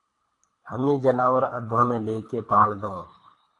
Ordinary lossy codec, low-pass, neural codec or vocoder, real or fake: AAC, 48 kbps; 10.8 kHz; codec, 24 kHz, 3 kbps, HILCodec; fake